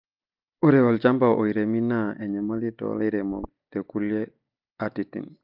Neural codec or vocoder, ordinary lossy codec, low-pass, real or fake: none; Opus, 24 kbps; 5.4 kHz; real